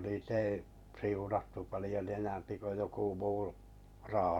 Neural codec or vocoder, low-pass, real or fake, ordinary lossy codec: vocoder, 44.1 kHz, 128 mel bands every 512 samples, BigVGAN v2; 19.8 kHz; fake; none